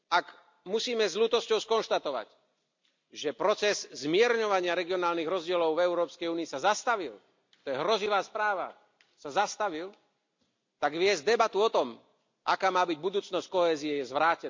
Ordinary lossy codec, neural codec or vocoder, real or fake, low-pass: MP3, 64 kbps; none; real; 7.2 kHz